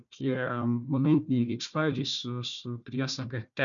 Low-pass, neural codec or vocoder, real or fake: 7.2 kHz; codec, 16 kHz, 1 kbps, FunCodec, trained on Chinese and English, 50 frames a second; fake